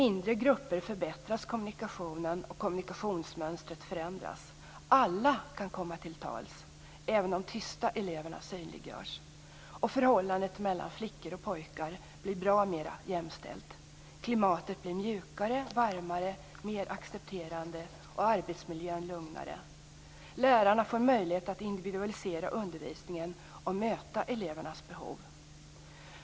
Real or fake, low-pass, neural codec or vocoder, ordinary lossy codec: real; none; none; none